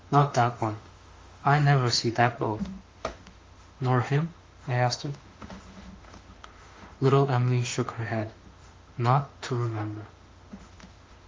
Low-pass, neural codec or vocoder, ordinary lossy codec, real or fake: 7.2 kHz; autoencoder, 48 kHz, 32 numbers a frame, DAC-VAE, trained on Japanese speech; Opus, 32 kbps; fake